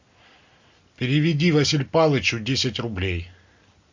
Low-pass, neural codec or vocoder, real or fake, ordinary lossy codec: 7.2 kHz; none; real; MP3, 64 kbps